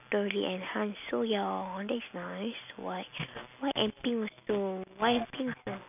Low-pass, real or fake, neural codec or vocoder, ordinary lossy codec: 3.6 kHz; real; none; none